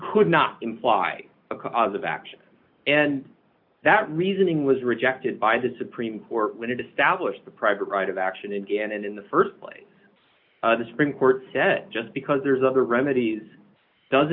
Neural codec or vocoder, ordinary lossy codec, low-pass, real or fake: none; MP3, 48 kbps; 5.4 kHz; real